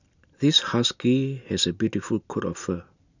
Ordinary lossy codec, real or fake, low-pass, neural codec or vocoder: none; real; 7.2 kHz; none